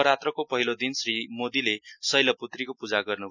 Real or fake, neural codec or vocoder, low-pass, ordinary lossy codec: real; none; 7.2 kHz; none